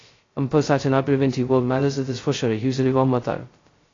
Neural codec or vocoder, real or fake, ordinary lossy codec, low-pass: codec, 16 kHz, 0.2 kbps, FocalCodec; fake; AAC, 32 kbps; 7.2 kHz